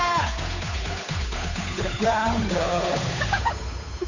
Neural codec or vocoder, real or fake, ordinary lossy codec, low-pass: codec, 16 kHz, 8 kbps, FunCodec, trained on Chinese and English, 25 frames a second; fake; none; 7.2 kHz